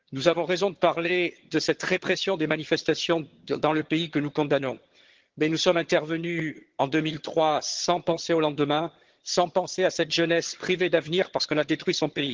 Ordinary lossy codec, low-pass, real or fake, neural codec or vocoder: Opus, 16 kbps; 7.2 kHz; fake; vocoder, 22.05 kHz, 80 mel bands, HiFi-GAN